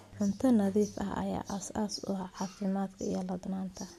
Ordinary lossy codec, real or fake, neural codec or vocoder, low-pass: MP3, 64 kbps; real; none; 14.4 kHz